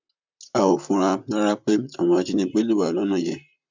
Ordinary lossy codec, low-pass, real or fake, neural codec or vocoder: MP3, 64 kbps; 7.2 kHz; fake; vocoder, 44.1 kHz, 128 mel bands, Pupu-Vocoder